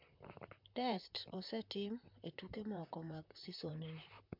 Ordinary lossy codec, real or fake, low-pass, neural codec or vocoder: MP3, 48 kbps; fake; 5.4 kHz; vocoder, 44.1 kHz, 128 mel bands, Pupu-Vocoder